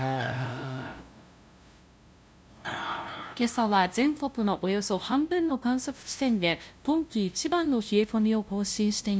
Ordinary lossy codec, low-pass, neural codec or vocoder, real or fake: none; none; codec, 16 kHz, 0.5 kbps, FunCodec, trained on LibriTTS, 25 frames a second; fake